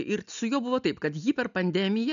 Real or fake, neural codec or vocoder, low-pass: real; none; 7.2 kHz